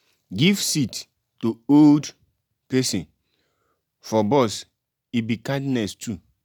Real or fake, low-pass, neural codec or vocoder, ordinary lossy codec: real; none; none; none